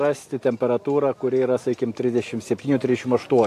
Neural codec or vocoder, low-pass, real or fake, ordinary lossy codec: none; 14.4 kHz; real; AAC, 64 kbps